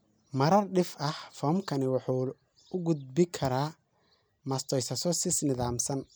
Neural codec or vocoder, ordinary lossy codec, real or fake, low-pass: none; none; real; none